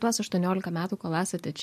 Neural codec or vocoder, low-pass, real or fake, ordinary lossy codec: none; 14.4 kHz; real; MP3, 64 kbps